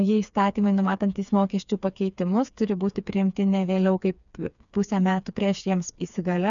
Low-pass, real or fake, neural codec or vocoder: 7.2 kHz; fake; codec, 16 kHz, 4 kbps, FreqCodec, smaller model